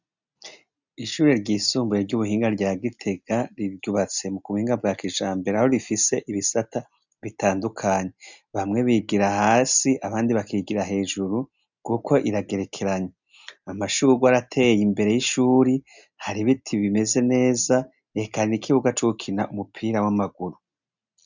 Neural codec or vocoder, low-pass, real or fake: none; 7.2 kHz; real